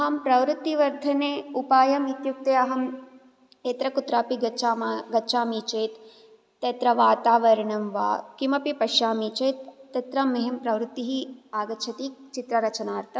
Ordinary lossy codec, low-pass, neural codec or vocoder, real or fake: none; none; none; real